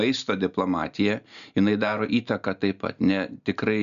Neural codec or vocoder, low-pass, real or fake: none; 7.2 kHz; real